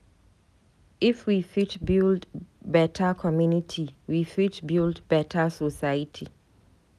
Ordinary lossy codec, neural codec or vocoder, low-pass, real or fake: none; none; 14.4 kHz; real